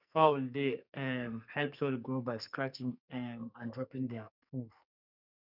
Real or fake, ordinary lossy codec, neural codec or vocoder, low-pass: fake; none; codec, 44.1 kHz, 2.6 kbps, SNAC; 5.4 kHz